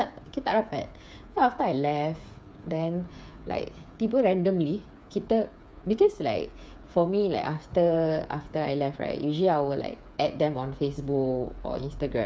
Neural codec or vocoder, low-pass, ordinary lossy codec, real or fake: codec, 16 kHz, 8 kbps, FreqCodec, smaller model; none; none; fake